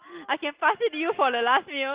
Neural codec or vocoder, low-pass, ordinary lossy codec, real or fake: none; 3.6 kHz; Opus, 16 kbps; real